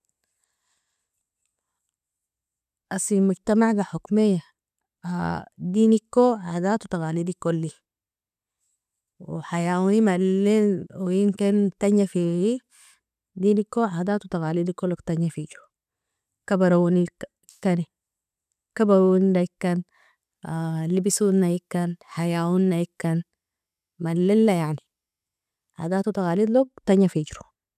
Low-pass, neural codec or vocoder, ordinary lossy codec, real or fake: 14.4 kHz; none; none; real